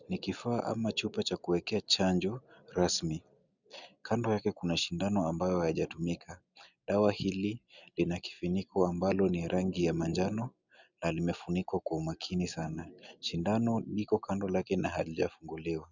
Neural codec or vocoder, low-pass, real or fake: none; 7.2 kHz; real